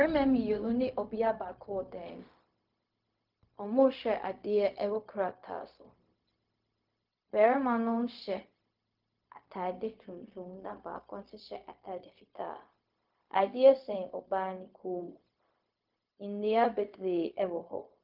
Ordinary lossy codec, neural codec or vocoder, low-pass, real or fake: Opus, 24 kbps; codec, 16 kHz, 0.4 kbps, LongCat-Audio-Codec; 5.4 kHz; fake